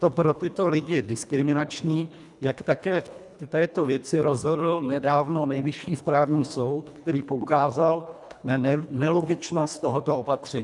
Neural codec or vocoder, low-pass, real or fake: codec, 24 kHz, 1.5 kbps, HILCodec; 10.8 kHz; fake